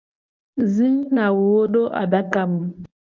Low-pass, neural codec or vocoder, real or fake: 7.2 kHz; codec, 24 kHz, 0.9 kbps, WavTokenizer, medium speech release version 1; fake